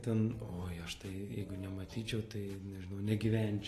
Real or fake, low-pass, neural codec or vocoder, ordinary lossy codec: real; 14.4 kHz; none; AAC, 48 kbps